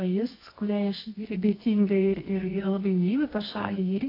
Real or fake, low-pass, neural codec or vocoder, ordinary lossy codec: fake; 5.4 kHz; codec, 24 kHz, 0.9 kbps, WavTokenizer, medium music audio release; AAC, 24 kbps